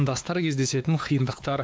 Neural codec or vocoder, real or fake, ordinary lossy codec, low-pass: codec, 16 kHz, 2 kbps, X-Codec, WavLM features, trained on Multilingual LibriSpeech; fake; none; none